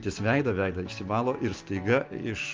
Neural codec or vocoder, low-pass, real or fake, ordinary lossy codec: none; 7.2 kHz; real; Opus, 32 kbps